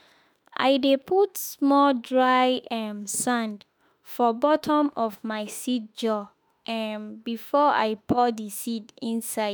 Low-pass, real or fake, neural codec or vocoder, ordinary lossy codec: none; fake; autoencoder, 48 kHz, 32 numbers a frame, DAC-VAE, trained on Japanese speech; none